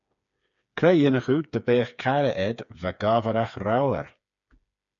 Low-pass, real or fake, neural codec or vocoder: 7.2 kHz; fake; codec, 16 kHz, 4 kbps, FreqCodec, smaller model